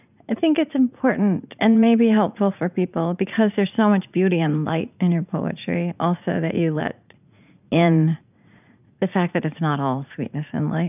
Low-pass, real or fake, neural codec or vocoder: 3.6 kHz; fake; vocoder, 44.1 kHz, 80 mel bands, Vocos